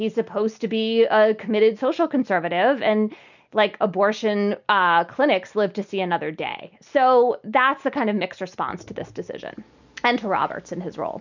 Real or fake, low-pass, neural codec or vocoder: real; 7.2 kHz; none